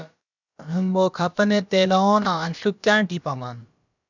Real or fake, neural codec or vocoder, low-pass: fake; codec, 16 kHz, about 1 kbps, DyCAST, with the encoder's durations; 7.2 kHz